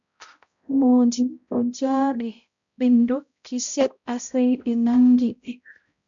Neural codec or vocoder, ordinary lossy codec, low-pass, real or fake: codec, 16 kHz, 0.5 kbps, X-Codec, HuBERT features, trained on balanced general audio; MP3, 64 kbps; 7.2 kHz; fake